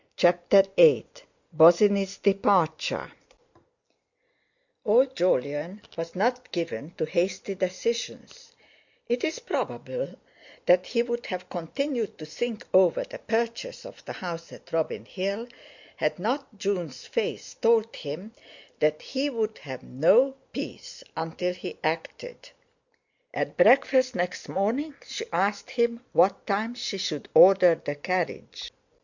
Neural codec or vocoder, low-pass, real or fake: none; 7.2 kHz; real